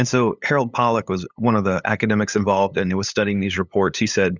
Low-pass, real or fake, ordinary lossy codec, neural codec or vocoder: 7.2 kHz; fake; Opus, 64 kbps; codec, 16 kHz, 8 kbps, FunCodec, trained on LibriTTS, 25 frames a second